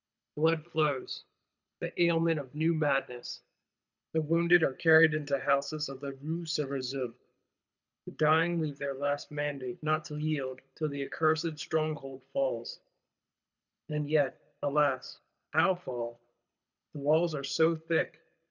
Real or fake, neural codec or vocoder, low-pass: fake; codec, 24 kHz, 6 kbps, HILCodec; 7.2 kHz